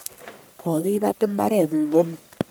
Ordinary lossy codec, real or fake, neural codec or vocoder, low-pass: none; fake; codec, 44.1 kHz, 1.7 kbps, Pupu-Codec; none